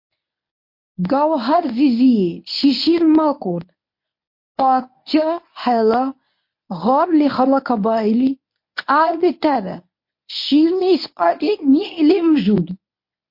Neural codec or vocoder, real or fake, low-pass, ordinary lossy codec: codec, 24 kHz, 0.9 kbps, WavTokenizer, medium speech release version 1; fake; 5.4 kHz; MP3, 32 kbps